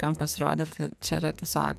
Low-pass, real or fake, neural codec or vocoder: 14.4 kHz; fake; codec, 44.1 kHz, 2.6 kbps, SNAC